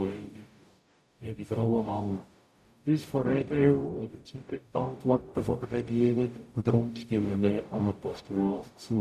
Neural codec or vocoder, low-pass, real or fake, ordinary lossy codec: codec, 44.1 kHz, 0.9 kbps, DAC; 14.4 kHz; fake; none